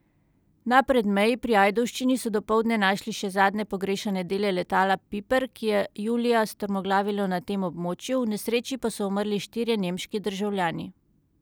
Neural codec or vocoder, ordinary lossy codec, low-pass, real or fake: none; none; none; real